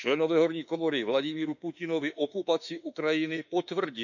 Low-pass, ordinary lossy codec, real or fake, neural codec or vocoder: 7.2 kHz; none; fake; autoencoder, 48 kHz, 32 numbers a frame, DAC-VAE, trained on Japanese speech